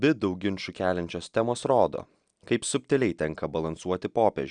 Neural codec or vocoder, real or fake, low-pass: none; real; 9.9 kHz